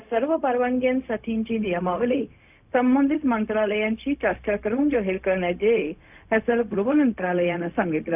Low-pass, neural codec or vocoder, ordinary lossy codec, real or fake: 3.6 kHz; codec, 16 kHz, 0.4 kbps, LongCat-Audio-Codec; none; fake